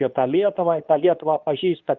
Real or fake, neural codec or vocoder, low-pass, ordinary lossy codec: fake; codec, 24 kHz, 0.9 kbps, WavTokenizer, medium speech release version 2; 7.2 kHz; Opus, 24 kbps